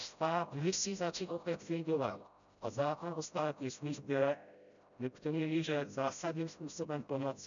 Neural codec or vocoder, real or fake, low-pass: codec, 16 kHz, 0.5 kbps, FreqCodec, smaller model; fake; 7.2 kHz